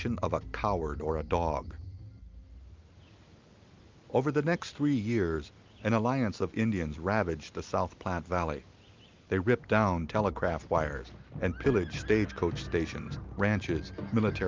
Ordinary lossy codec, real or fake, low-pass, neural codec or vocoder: Opus, 32 kbps; real; 7.2 kHz; none